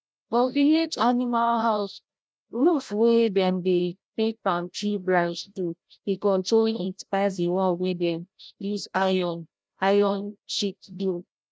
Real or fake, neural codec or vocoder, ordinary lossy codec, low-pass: fake; codec, 16 kHz, 0.5 kbps, FreqCodec, larger model; none; none